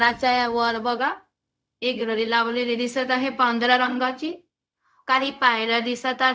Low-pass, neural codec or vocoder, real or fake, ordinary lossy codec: none; codec, 16 kHz, 0.4 kbps, LongCat-Audio-Codec; fake; none